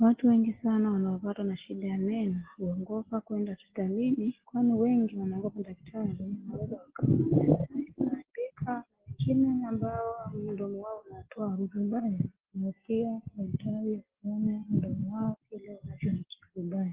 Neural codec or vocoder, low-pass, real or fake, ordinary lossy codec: none; 3.6 kHz; real; Opus, 16 kbps